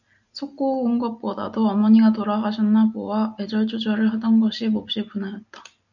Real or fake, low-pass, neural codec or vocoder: real; 7.2 kHz; none